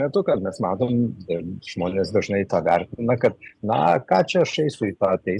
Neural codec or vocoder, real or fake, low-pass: none; real; 10.8 kHz